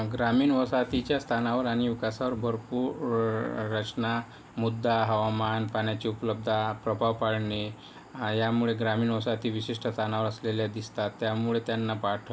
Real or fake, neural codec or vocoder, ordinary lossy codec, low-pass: real; none; none; none